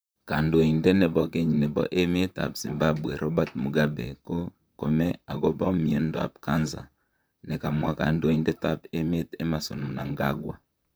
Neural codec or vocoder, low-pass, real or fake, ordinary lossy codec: vocoder, 44.1 kHz, 128 mel bands, Pupu-Vocoder; none; fake; none